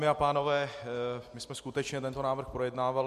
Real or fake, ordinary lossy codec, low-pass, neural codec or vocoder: real; MP3, 64 kbps; 14.4 kHz; none